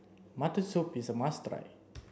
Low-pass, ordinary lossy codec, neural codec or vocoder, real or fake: none; none; none; real